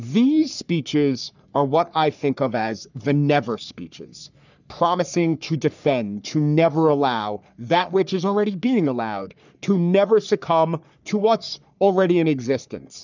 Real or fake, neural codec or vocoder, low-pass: fake; codec, 44.1 kHz, 3.4 kbps, Pupu-Codec; 7.2 kHz